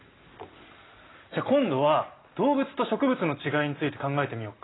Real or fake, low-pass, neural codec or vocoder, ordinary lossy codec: real; 7.2 kHz; none; AAC, 16 kbps